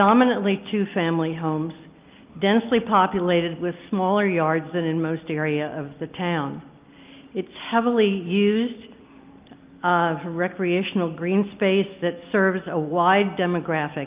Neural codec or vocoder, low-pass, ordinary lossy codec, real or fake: none; 3.6 kHz; Opus, 24 kbps; real